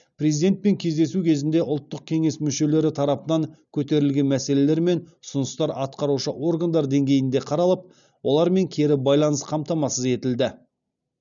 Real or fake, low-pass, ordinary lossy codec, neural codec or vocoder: real; 7.2 kHz; none; none